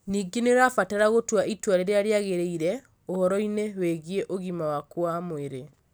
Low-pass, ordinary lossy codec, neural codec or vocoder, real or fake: none; none; none; real